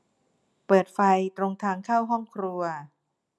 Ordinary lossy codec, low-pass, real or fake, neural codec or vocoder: none; none; real; none